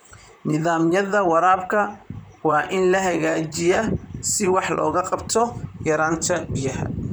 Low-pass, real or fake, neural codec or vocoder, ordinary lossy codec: none; fake; vocoder, 44.1 kHz, 128 mel bands, Pupu-Vocoder; none